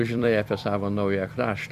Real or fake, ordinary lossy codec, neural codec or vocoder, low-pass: fake; Opus, 64 kbps; vocoder, 44.1 kHz, 128 mel bands every 256 samples, BigVGAN v2; 14.4 kHz